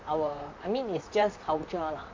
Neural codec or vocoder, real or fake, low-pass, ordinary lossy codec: vocoder, 44.1 kHz, 128 mel bands, Pupu-Vocoder; fake; 7.2 kHz; MP3, 48 kbps